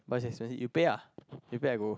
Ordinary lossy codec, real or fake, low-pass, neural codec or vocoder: none; real; none; none